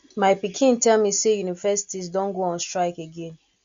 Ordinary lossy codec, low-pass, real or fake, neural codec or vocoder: MP3, 64 kbps; 7.2 kHz; real; none